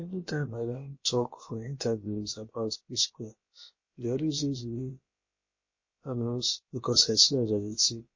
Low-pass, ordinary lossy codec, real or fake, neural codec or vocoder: 7.2 kHz; MP3, 32 kbps; fake; codec, 16 kHz, about 1 kbps, DyCAST, with the encoder's durations